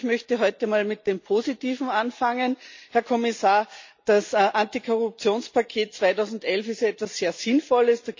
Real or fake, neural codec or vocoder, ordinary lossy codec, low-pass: real; none; MP3, 64 kbps; 7.2 kHz